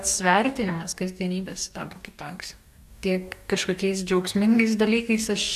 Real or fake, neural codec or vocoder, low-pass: fake; codec, 44.1 kHz, 2.6 kbps, DAC; 14.4 kHz